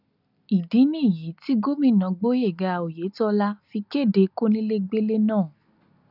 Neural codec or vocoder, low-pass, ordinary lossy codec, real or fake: none; 5.4 kHz; none; real